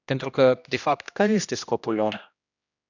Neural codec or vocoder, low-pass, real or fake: codec, 16 kHz, 1 kbps, X-Codec, HuBERT features, trained on general audio; 7.2 kHz; fake